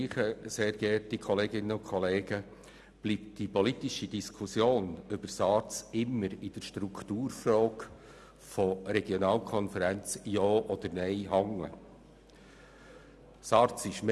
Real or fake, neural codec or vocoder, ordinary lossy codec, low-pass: real; none; none; none